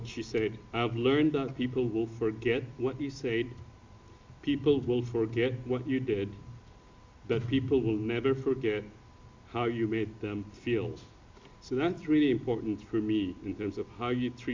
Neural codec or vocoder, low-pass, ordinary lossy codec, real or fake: none; 7.2 kHz; MP3, 64 kbps; real